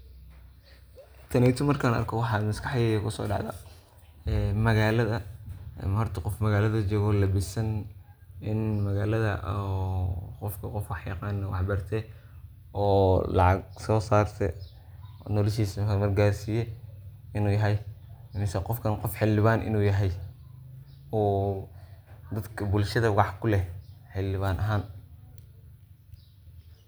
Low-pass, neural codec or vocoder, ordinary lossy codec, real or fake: none; none; none; real